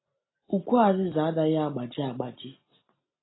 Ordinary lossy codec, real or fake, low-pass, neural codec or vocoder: AAC, 16 kbps; real; 7.2 kHz; none